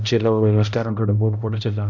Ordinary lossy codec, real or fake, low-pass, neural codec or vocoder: none; fake; 7.2 kHz; codec, 16 kHz, 0.5 kbps, X-Codec, HuBERT features, trained on balanced general audio